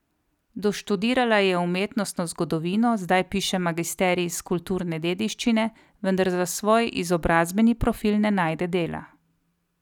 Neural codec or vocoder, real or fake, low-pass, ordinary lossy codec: none; real; 19.8 kHz; none